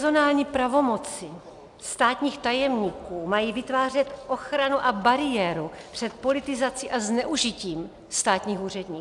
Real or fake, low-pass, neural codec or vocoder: real; 10.8 kHz; none